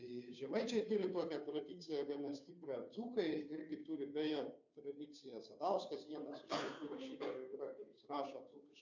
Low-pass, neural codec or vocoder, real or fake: 7.2 kHz; codec, 16 kHz, 2 kbps, FunCodec, trained on Chinese and English, 25 frames a second; fake